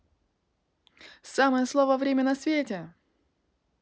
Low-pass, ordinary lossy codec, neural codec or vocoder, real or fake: none; none; none; real